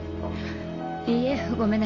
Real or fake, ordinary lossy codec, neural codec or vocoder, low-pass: real; MP3, 48 kbps; none; 7.2 kHz